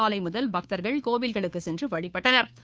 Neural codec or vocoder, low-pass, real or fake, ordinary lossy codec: codec, 16 kHz, 2 kbps, FunCodec, trained on Chinese and English, 25 frames a second; none; fake; none